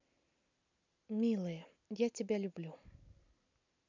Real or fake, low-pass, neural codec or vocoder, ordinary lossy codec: real; 7.2 kHz; none; none